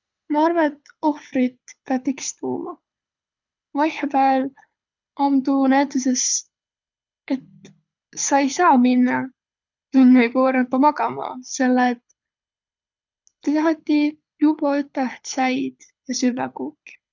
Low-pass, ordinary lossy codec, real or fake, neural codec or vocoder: 7.2 kHz; none; fake; codec, 24 kHz, 6 kbps, HILCodec